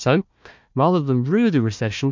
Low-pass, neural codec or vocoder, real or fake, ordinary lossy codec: 7.2 kHz; codec, 16 kHz, 1 kbps, FunCodec, trained on Chinese and English, 50 frames a second; fake; MP3, 64 kbps